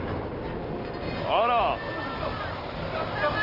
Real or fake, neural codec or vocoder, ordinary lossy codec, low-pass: real; none; Opus, 24 kbps; 5.4 kHz